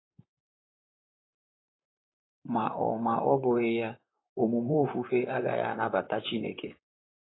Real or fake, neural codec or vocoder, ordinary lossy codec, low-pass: fake; vocoder, 44.1 kHz, 128 mel bands, Pupu-Vocoder; AAC, 16 kbps; 7.2 kHz